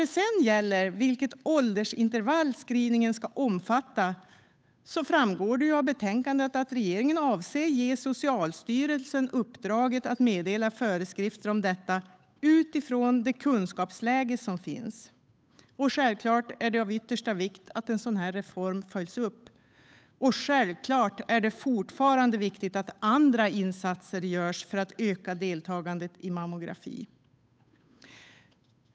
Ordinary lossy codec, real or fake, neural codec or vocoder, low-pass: none; fake; codec, 16 kHz, 8 kbps, FunCodec, trained on Chinese and English, 25 frames a second; none